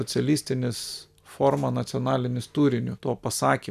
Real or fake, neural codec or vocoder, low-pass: real; none; 14.4 kHz